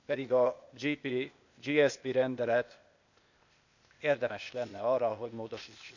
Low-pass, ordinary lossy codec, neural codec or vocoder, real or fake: 7.2 kHz; none; codec, 16 kHz, 0.8 kbps, ZipCodec; fake